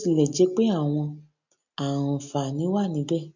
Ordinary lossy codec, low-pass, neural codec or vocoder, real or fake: none; 7.2 kHz; none; real